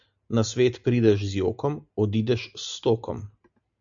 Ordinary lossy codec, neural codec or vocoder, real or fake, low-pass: MP3, 64 kbps; none; real; 7.2 kHz